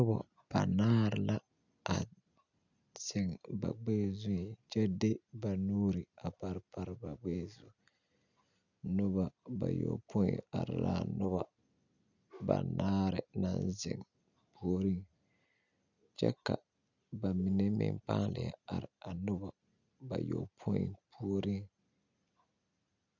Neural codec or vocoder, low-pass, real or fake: none; 7.2 kHz; real